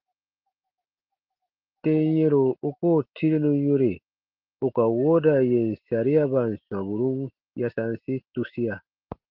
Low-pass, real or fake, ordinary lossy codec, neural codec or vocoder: 5.4 kHz; real; Opus, 16 kbps; none